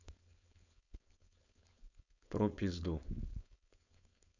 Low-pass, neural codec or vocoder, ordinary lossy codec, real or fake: 7.2 kHz; codec, 16 kHz, 4.8 kbps, FACodec; none; fake